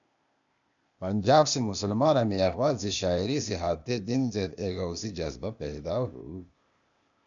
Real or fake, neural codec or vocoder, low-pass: fake; codec, 16 kHz, 0.8 kbps, ZipCodec; 7.2 kHz